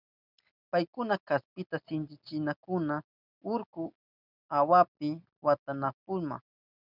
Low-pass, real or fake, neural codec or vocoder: 5.4 kHz; real; none